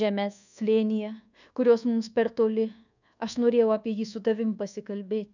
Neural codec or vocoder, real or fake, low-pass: codec, 24 kHz, 1.2 kbps, DualCodec; fake; 7.2 kHz